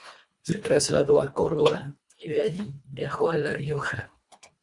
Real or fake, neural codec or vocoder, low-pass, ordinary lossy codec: fake; codec, 24 kHz, 1.5 kbps, HILCodec; 10.8 kHz; Opus, 64 kbps